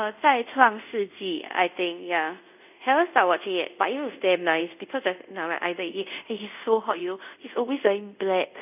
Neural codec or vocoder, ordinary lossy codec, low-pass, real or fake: codec, 24 kHz, 0.5 kbps, DualCodec; none; 3.6 kHz; fake